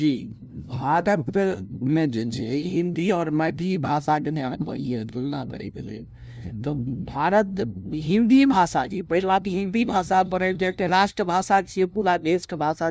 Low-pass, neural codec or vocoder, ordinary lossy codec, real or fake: none; codec, 16 kHz, 0.5 kbps, FunCodec, trained on LibriTTS, 25 frames a second; none; fake